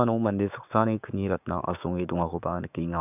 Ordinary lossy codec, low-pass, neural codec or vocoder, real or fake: none; 3.6 kHz; codec, 16 kHz, 6 kbps, DAC; fake